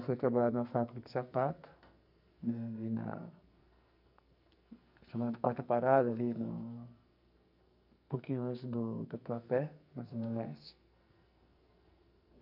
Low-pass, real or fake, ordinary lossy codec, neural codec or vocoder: 5.4 kHz; fake; none; codec, 44.1 kHz, 2.6 kbps, SNAC